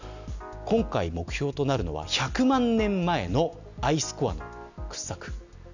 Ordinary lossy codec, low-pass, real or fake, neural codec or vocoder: none; 7.2 kHz; real; none